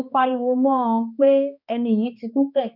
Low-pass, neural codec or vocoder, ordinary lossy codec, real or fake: 5.4 kHz; codec, 16 kHz, 2 kbps, X-Codec, HuBERT features, trained on balanced general audio; none; fake